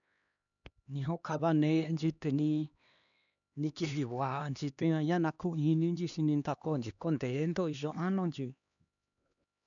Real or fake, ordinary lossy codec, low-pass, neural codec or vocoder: fake; none; 7.2 kHz; codec, 16 kHz, 2 kbps, X-Codec, HuBERT features, trained on LibriSpeech